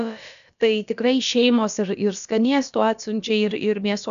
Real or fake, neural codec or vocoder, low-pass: fake; codec, 16 kHz, about 1 kbps, DyCAST, with the encoder's durations; 7.2 kHz